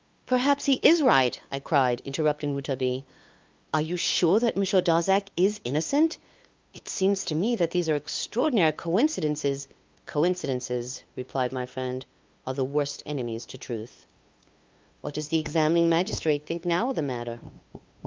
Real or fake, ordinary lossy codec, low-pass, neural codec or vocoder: fake; Opus, 24 kbps; 7.2 kHz; codec, 16 kHz, 2 kbps, FunCodec, trained on LibriTTS, 25 frames a second